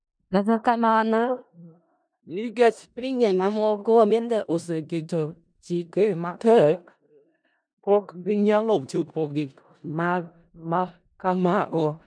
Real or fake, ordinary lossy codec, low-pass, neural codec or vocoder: fake; none; 9.9 kHz; codec, 16 kHz in and 24 kHz out, 0.4 kbps, LongCat-Audio-Codec, four codebook decoder